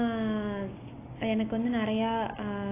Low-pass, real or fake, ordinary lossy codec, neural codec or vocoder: 3.6 kHz; real; AAC, 24 kbps; none